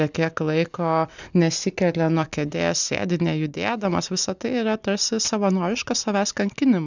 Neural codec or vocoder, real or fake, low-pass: none; real; 7.2 kHz